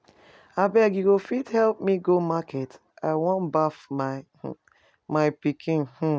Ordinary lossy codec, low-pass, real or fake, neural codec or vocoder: none; none; real; none